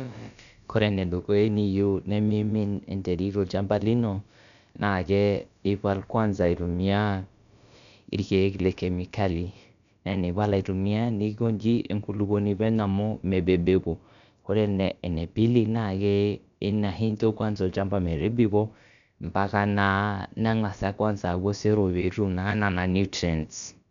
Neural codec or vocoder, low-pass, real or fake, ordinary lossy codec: codec, 16 kHz, about 1 kbps, DyCAST, with the encoder's durations; 7.2 kHz; fake; none